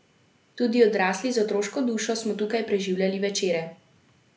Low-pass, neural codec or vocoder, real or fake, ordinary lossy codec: none; none; real; none